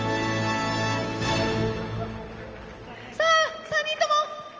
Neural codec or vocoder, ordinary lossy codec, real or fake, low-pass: none; Opus, 24 kbps; real; 7.2 kHz